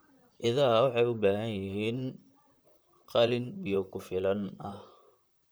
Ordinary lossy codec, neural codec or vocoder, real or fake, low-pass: none; vocoder, 44.1 kHz, 128 mel bands, Pupu-Vocoder; fake; none